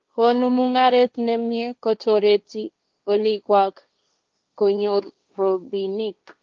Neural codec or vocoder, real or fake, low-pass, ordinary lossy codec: codec, 16 kHz, 1.1 kbps, Voila-Tokenizer; fake; 7.2 kHz; Opus, 24 kbps